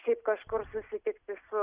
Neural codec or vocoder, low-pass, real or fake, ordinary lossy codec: none; 3.6 kHz; real; Opus, 64 kbps